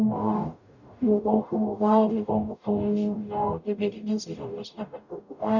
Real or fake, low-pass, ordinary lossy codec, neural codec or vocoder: fake; 7.2 kHz; none; codec, 44.1 kHz, 0.9 kbps, DAC